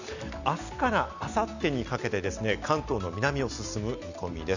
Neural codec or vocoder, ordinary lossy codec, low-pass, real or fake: none; none; 7.2 kHz; real